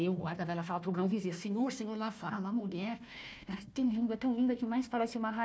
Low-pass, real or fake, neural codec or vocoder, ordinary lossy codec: none; fake; codec, 16 kHz, 1 kbps, FunCodec, trained on Chinese and English, 50 frames a second; none